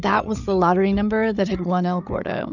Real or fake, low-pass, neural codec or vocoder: fake; 7.2 kHz; codec, 16 kHz, 8 kbps, FreqCodec, larger model